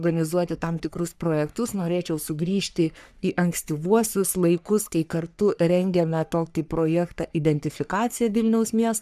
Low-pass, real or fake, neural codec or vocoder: 14.4 kHz; fake; codec, 44.1 kHz, 3.4 kbps, Pupu-Codec